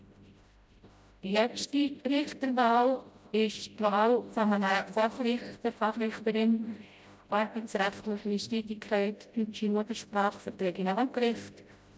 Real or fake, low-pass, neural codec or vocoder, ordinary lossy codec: fake; none; codec, 16 kHz, 0.5 kbps, FreqCodec, smaller model; none